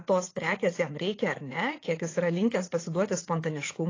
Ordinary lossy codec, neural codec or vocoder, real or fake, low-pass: AAC, 32 kbps; vocoder, 44.1 kHz, 80 mel bands, Vocos; fake; 7.2 kHz